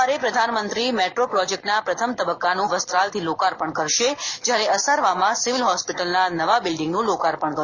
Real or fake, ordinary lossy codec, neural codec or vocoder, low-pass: real; none; none; 7.2 kHz